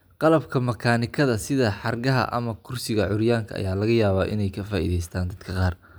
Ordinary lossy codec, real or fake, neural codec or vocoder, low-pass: none; real; none; none